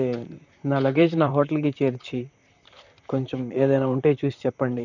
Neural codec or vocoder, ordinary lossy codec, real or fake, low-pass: vocoder, 44.1 kHz, 128 mel bands, Pupu-Vocoder; none; fake; 7.2 kHz